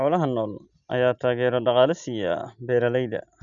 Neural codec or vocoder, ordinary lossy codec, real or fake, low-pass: none; none; real; 7.2 kHz